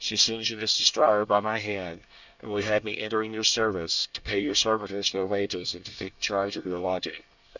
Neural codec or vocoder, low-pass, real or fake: codec, 24 kHz, 1 kbps, SNAC; 7.2 kHz; fake